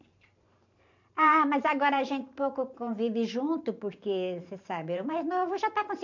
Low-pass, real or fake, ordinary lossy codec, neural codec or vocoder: 7.2 kHz; fake; none; vocoder, 44.1 kHz, 128 mel bands every 512 samples, BigVGAN v2